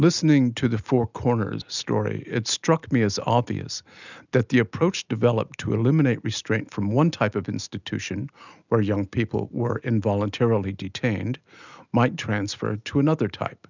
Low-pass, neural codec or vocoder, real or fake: 7.2 kHz; none; real